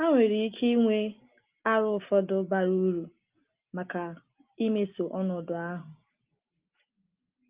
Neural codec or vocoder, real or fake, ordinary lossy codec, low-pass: none; real; Opus, 32 kbps; 3.6 kHz